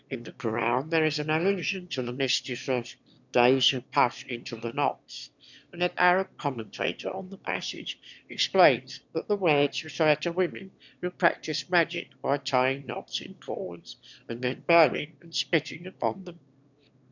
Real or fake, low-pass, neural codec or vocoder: fake; 7.2 kHz; autoencoder, 22.05 kHz, a latent of 192 numbers a frame, VITS, trained on one speaker